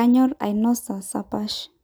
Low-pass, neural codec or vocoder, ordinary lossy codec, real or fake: none; none; none; real